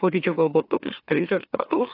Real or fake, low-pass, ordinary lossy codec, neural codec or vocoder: fake; 5.4 kHz; AAC, 32 kbps; autoencoder, 44.1 kHz, a latent of 192 numbers a frame, MeloTTS